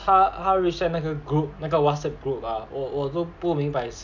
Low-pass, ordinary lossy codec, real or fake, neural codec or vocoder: 7.2 kHz; none; real; none